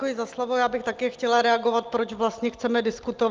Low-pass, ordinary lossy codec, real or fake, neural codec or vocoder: 7.2 kHz; Opus, 32 kbps; real; none